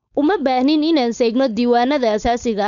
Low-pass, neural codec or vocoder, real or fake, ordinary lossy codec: 7.2 kHz; codec, 16 kHz, 4.8 kbps, FACodec; fake; none